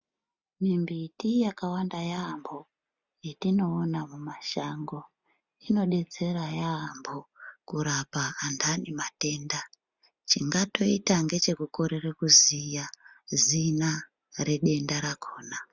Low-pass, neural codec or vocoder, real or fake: 7.2 kHz; none; real